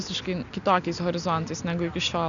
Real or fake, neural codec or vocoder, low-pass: real; none; 7.2 kHz